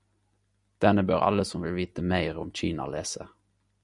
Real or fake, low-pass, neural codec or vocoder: real; 10.8 kHz; none